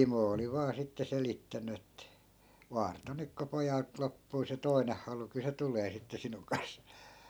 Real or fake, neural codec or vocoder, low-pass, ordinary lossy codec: real; none; none; none